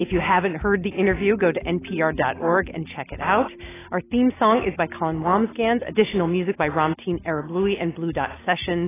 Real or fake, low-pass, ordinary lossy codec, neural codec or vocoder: real; 3.6 kHz; AAC, 16 kbps; none